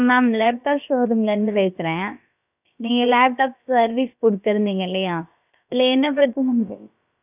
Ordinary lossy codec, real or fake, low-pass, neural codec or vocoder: none; fake; 3.6 kHz; codec, 16 kHz, about 1 kbps, DyCAST, with the encoder's durations